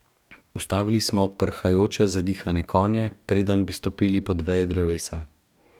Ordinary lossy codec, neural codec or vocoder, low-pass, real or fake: none; codec, 44.1 kHz, 2.6 kbps, DAC; 19.8 kHz; fake